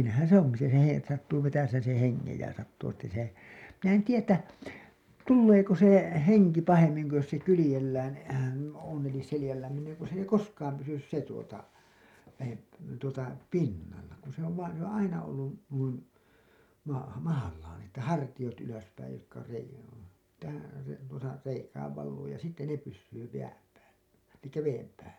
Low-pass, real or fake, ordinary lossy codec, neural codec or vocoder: 19.8 kHz; real; none; none